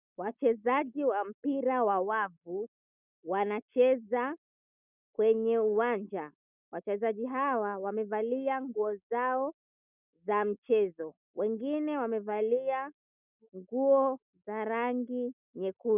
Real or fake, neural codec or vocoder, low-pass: real; none; 3.6 kHz